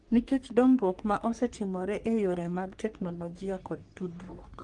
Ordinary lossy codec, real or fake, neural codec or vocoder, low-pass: Opus, 32 kbps; fake; codec, 44.1 kHz, 3.4 kbps, Pupu-Codec; 10.8 kHz